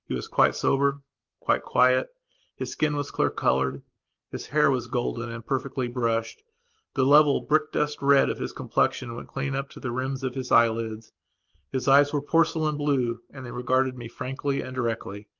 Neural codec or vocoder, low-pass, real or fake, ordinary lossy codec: none; 7.2 kHz; real; Opus, 32 kbps